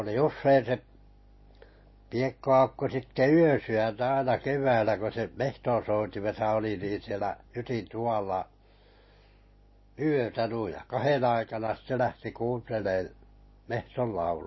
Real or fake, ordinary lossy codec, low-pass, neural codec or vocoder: real; MP3, 24 kbps; 7.2 kHz; none